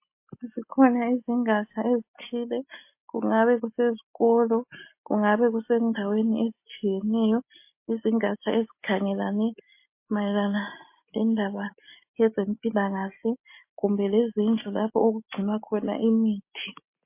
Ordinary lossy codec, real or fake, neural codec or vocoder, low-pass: MP3, 24 kbps; real; none; 3.6 kHz